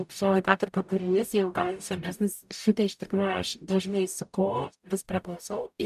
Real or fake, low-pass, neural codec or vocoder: fake; 14.4 kHz; codec, 44.1 kHz, 0.9 kbps, DAC